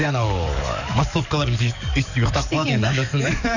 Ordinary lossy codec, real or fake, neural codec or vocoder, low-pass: none; fake; codec, 44.1 kHz, 7.8 kbps, DAC; 7.2 kHz